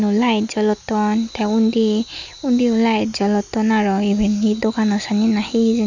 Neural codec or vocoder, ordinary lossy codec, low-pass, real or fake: none; none; 7.2 kHz; real